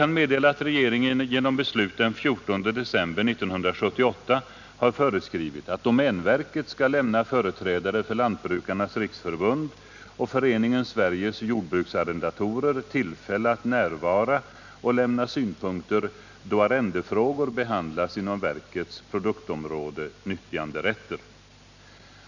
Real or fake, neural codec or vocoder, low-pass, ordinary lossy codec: real; none; 7.2 kHz; none